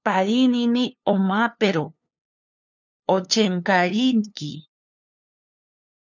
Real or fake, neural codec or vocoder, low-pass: fake; codec, 16 kHz, 2 kbps, FunCodec, trained on LibriTTS, 25 frames a second; 7.2 kHz